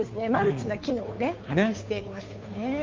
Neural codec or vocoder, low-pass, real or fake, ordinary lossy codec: codec, 16 kHz, 2 kbps, FunCodec, trained on Chinese and English, 25 frames a second; 7.2 kHz; fake; Opus, 24 kbps